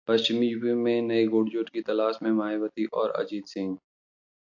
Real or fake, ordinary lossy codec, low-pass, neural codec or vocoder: real; AAC, 48 kbps; 7.2 kHz; none